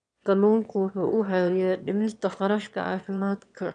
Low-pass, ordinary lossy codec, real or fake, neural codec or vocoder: 9.9 kHz; none; fake; autoencoder, 22.05 kHz, a latent of 192 numbers a frame, VITS, trained on one speaker